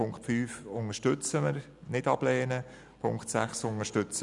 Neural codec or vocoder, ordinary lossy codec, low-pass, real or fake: none; none; 10.8 kHz; real